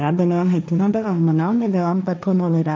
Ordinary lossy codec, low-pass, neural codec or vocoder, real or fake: none; none; codec, 16 kHz, 1.1 kbps, Voila-Tokenizer; fake